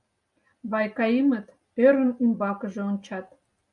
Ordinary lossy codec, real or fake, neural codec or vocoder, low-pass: MP3, 96 kbps; real; none; 10.8 kHz